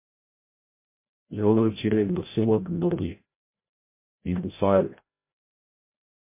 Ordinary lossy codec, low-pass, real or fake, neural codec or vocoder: MP3, 24 kbps; 3.6 kHz; fake; codec, 16 kHz, 0.5 kbps, FreqCodec, larger model